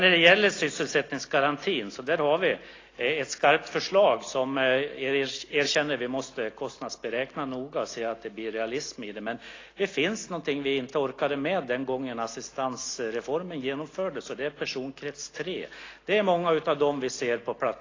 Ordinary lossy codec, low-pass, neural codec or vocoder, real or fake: AAC, 32 kbps; 7.2 kHz; none; real